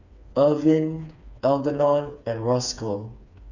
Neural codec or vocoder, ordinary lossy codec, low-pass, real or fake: codec, 16 kHz, 4 kbps, FreqCodec, smaller model; none; 7.2 kHz; fake